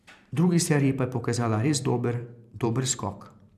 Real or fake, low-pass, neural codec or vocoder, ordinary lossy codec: fake; 14.4 kHz; vocoder, 44.1 kHz, 128 mel bands every 512 samples, BigVGAN v2; none